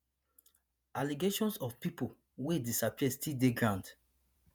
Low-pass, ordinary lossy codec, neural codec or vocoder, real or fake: none; none; vocoder, 48 kHz, 128 mel bands, Vocos; fake